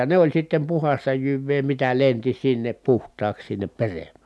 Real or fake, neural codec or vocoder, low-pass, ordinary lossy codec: real; none; none; none